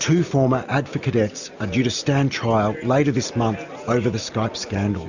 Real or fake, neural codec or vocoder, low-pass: real; none; 7.2 kHz